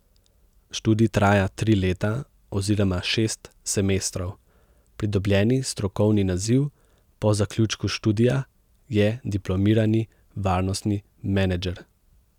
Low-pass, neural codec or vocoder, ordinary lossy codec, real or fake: 19.8 kHz; none; none; real